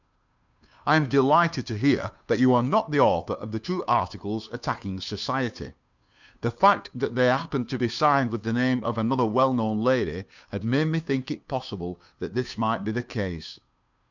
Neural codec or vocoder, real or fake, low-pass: codec, 16 kHz, 2 kbps, FunCodec, trained on Chinese and English, 25 frames a second; fake; 7.2 kHz